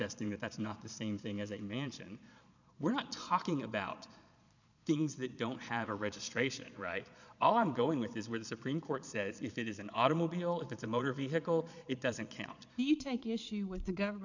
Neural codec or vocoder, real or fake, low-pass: vocoder, 22.05 kHz, 80 mel bands, Vocos; fake; 7.2 kHz